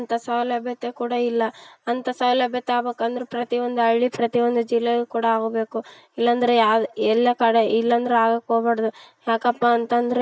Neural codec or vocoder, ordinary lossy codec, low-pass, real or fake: none; none; none; real